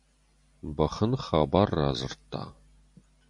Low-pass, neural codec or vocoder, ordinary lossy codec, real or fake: 10.8 kHz; none; MP3, 64 kbps; real